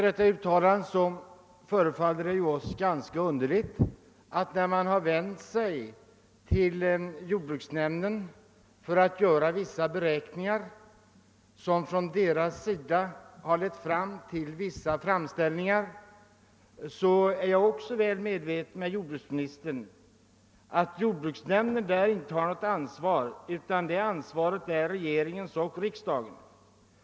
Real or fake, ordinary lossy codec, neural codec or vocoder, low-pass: real; none; none; none